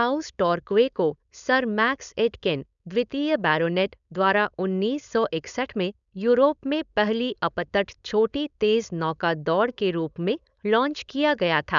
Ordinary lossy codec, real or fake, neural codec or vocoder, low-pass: none; fake; codec, 16 kHz, 4.8 kbps, FACodec; 7.2 kHz